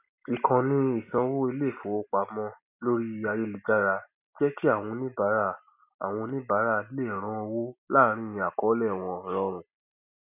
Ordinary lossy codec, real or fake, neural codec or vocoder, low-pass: none; real; none; 3.6 kHz